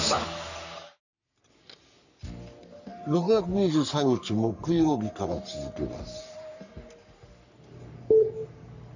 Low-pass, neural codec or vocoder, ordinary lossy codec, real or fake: 7.2 kHz; codec, 44.1 kHz, 3.4 kbps, Pupu-Codec; none; fake